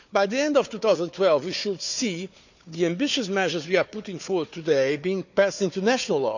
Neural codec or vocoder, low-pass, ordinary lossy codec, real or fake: codec, 16 kHz, 4 kbps, FunCodec, trained on Chinese and English, 50 frames a second; 7.2 kHz; none; fake